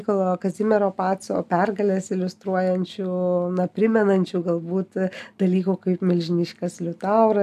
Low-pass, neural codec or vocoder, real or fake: 14.4 kHz; none; real